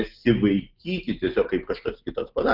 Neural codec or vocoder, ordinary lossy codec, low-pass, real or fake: none; Opus, 24 kbps; 5.4 kHz; real